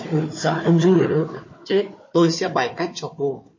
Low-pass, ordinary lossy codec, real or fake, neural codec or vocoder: 7.2 kHz; MP3, 32 kbps; fake; codec, 16 kHz, 4 kbps, FunCodec, trained on Chinese and English, 50 frames a second